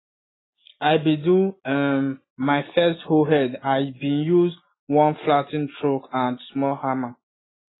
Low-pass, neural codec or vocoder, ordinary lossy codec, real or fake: 7.2 kHz; none; AAC, 16 kbps; real